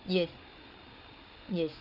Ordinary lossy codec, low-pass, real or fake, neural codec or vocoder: none; 5.4 kHz; fake; codec, 16 kHz, 8 kbps, FreqCodec, larger model